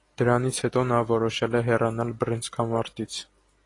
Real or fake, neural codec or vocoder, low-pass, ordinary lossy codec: real; none; 10.8 kHz; AAC, 32 kbps